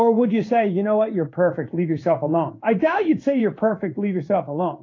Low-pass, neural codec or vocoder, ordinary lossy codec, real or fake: 7.2 kHz; codec, 16 kHz in and 24 kHz out, 1 kbps, XY-Tokenizer; AAC, 32 kbps; fake